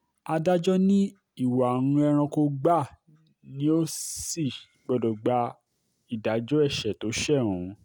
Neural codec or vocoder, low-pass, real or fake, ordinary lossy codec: none; none; real; none